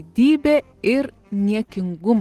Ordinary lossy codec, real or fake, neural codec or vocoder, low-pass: Opus, 16 kbps; real; none; 14.4 kHz